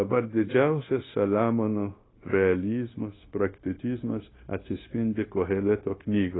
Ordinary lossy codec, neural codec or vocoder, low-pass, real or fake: AAC, 16 kbps; codec, 16 kHz, 0.9 kbps, LongCat-Audio-Codec; 7.2 kHz; fake